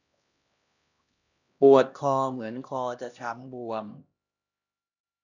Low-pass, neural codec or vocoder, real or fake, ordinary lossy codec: 7.2 kHz; codec, 16 kHz, 1 kbps, X-Codec, HuBERT features, trained on LibriSpeech; fake; none